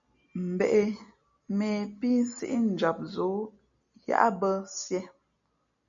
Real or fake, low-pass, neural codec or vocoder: real; 7.2 kHz; none